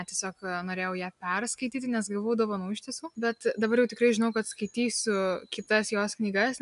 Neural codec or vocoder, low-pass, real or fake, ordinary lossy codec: none; 10.8 kHz; real; MP3, 96 kbps